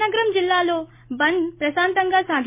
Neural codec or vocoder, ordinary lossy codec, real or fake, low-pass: none; MP3, 24 kbps; real; 3.6 kHz